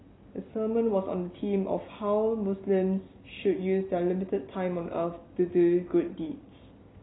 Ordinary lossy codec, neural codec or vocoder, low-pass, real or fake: AAC, 16 kbps; none; 7.2 kHz; real